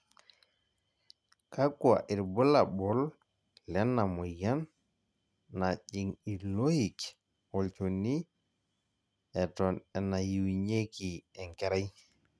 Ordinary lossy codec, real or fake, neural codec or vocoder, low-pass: none; real; none; none